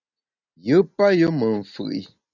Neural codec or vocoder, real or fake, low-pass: none; real; 7.2 kHz